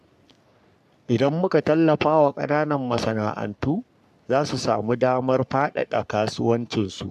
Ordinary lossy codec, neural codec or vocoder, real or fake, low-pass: AAC, 96 kbps; codec, 44.1 kHz, 3.4 kbps, Pupu-Codec; fake; 14.4 kHz